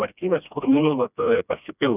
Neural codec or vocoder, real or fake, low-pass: codec, 16 kHz, 1 kbps, FreqCodec, smaller model; fake; 3.6 kHz